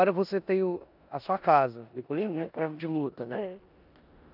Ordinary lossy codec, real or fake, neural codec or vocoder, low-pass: none; fake; codec, 16 kHz in and 24 kHz out, 0.9 kbps, LongCat-Audio-Codec, four codebook decoder; 5.4 kHz